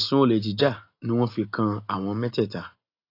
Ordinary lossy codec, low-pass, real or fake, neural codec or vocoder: AAC, 32 kbps; 5.4 kHz; real; none